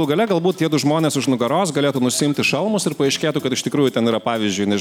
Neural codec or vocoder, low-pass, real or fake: none; 19.8 kHz; real